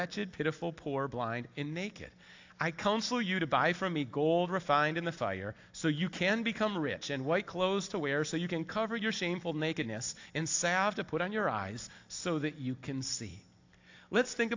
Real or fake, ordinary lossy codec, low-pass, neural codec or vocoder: real; AAC, 48 kbps; 7.2 kHz; none